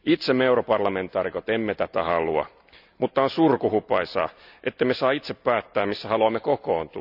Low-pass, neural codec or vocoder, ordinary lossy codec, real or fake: 5.4 kHz; none; none; real